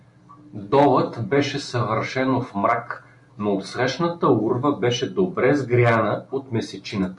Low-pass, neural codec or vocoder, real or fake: 10.8 kHz; none; real